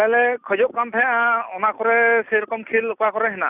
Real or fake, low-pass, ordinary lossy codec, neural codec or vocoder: real; 3.6 kHz; none; none